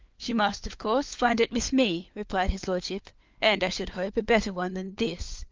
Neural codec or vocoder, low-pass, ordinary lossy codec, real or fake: codec, 16 kHz, 4 kbps, FunCodec, trained on LibriTTS, 50 frames a second; 7.2 kHz; Opus, 24 kbps; fake